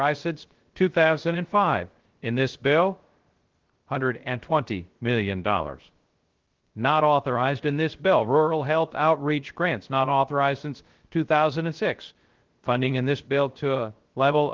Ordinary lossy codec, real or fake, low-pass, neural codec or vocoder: Opus, 16 kbps; fake; 7.2 kHz; codec, 16 kHz, 0.3 kbps, FocalCodec